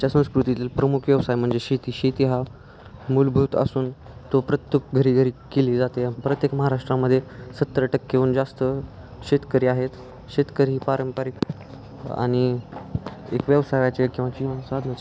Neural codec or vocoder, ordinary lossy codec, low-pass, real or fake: none; none; none; real